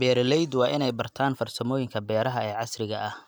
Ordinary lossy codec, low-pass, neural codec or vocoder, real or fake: none; none; none; real